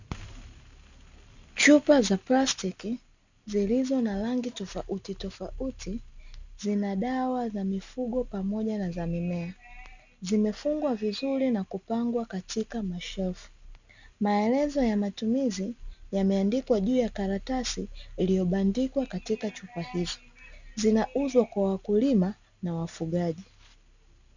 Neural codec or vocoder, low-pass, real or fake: none; 7.2 kHz; real